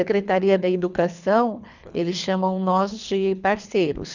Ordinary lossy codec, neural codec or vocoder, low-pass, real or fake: none; codec, 24 kHz, 3 kbps, HILCodec; 7.2 kHz; fake